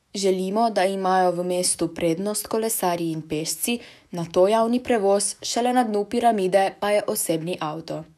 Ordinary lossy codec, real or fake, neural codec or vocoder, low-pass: none; fake; autoencoder, 48 kHz, 128 numbers a frame, DAC-VAE, trained on Japanese speech; 14.4 kHz